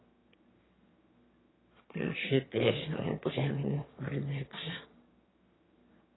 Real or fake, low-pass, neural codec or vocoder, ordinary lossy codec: fake; 7.2 kHz; autoencoder, 22.05 kHz, a latent of 192 numbers a frame, VITS, trained on one speaker; AAC, 16 kbps